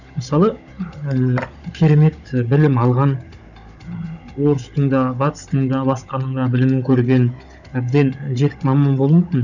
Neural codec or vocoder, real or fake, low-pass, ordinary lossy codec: codec, 16 kHz, 16 kbps, FunCodec, trained on Chinese and English, 50 frames a second; fake; 7.2 kHz; none